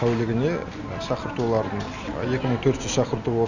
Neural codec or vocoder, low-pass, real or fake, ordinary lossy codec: none; 7.2 kHz; real; none